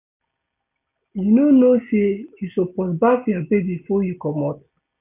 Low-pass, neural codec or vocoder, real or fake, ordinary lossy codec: 3.6 kHz; none; real; none